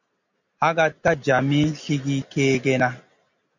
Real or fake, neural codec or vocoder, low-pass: real; none; 7.2 kHz